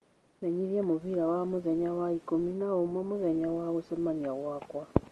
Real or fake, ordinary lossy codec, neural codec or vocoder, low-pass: real; Opus, 24 kbps; none; 10.8 kHz